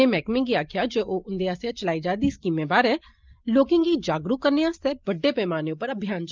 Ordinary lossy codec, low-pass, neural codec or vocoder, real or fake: Opus, 24 kbps; 7.2 kHz; none; real